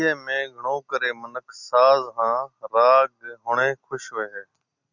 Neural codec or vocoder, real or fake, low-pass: none; real; 7.2 kHz